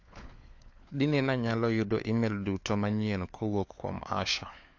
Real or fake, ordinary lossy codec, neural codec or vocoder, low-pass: fake; AAC, 48 kbps; codec, 16 kHz, 4 kbps, FunCodec, trained on LibriTTS, 50 frames a second; 7.2 kHz